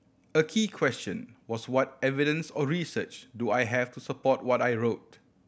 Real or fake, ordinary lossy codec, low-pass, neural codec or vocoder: real; none; none; none